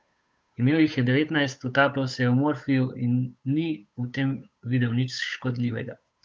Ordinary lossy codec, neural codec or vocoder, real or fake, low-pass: none; codec, 16 kHz, 8 kbps, FunCodec, trained on Chinese and English, 25 frames a second; fake; none